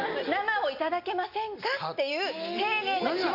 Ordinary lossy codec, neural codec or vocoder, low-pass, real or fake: none; none; 5.4 kHz; real